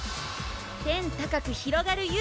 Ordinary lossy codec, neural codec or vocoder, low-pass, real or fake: none; none; none; real